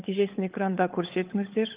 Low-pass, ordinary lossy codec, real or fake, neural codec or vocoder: 3.6 kHz; Opus, 32 kbps; fake; codec, 16 kHz, 16 kbps, FunCodec, trained on LibriTTS, 50 frames a second